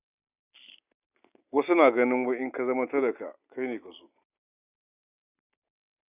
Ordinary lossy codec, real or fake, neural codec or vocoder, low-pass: none; real; none; 3.6 kHz